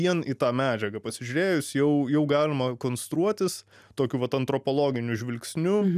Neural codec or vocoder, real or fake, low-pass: none; real; 14.4 kHz